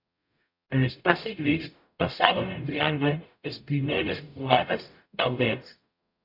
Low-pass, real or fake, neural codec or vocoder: 5.4 kHz; fake; codec, 44.1 kHz, 0.9 kbps, DAC